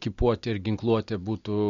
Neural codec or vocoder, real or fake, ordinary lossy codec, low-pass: none; real; MP3, 48 kbps; 7.2 kHz